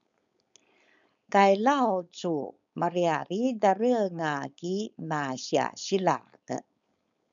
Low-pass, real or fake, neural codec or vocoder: 7.2 kHz; fake; codec, 16 kHz, 4.8 kbps, FACodec